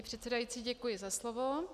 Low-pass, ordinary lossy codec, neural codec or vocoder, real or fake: 14.4 kHz; AAC, 96 kbps; none; real